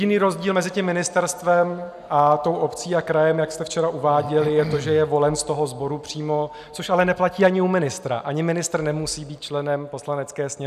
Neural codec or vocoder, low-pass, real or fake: none; 14.4 kHz; real